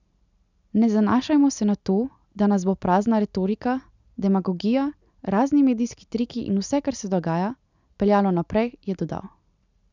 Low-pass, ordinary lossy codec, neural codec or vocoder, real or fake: 7.2 kHz; none; none; real